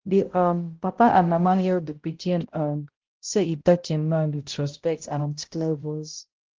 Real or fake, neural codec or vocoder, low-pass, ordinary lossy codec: fake; codec, 16 kHz, 0.5 kbps, X-Codec, HuBERT features, trained on balanced general audio; 7.2 kHz; Opus, 16 kbps